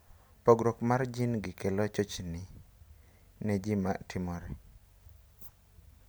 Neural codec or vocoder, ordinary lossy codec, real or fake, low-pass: none; none; real; none